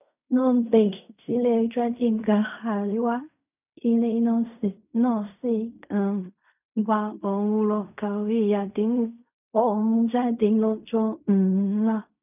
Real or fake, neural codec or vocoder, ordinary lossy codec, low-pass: fake; codec, 16 kHz in and 24 kHz out, 0.4 kbps, LongCat-Audio-Codec, fine tuned four codebook decoder; none; 3.6 kHz